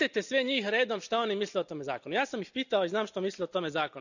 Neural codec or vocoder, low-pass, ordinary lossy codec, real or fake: none; 7.2 kHz; none; real